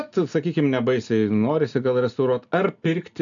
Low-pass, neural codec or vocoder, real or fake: 7.2 kHz; none; real